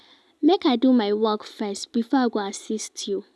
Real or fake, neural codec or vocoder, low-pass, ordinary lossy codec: real; none; none; none